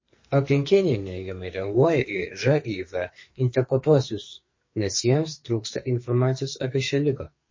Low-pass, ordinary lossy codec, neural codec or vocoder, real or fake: 7.2 kHz; MP3, 32 kbps; codec, 44.1 kHz, 2.6 kbps, SNAC; fake